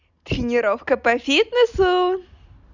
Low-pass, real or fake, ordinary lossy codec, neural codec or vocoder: 7.2 kHz; real; none; none